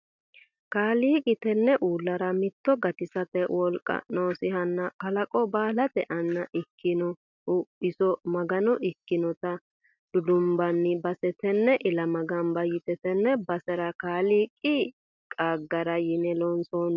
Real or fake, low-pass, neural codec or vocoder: real; 7.2 kHz; none